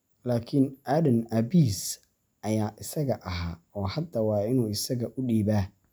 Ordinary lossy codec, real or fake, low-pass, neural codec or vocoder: none; real; none; none